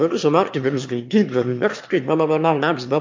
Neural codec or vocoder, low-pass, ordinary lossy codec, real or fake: autoencoder, 22.05 kHz, a latent of 192 numbers a frame, VITS, trained on one speaker; 7.2 kHz; MP3, 48 kbps; fake